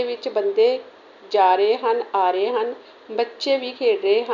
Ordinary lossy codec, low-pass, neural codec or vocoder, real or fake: none; 7.2 kHz; none; real